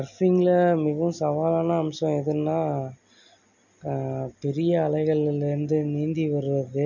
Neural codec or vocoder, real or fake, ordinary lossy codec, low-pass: none; real; none; 7.2 kHz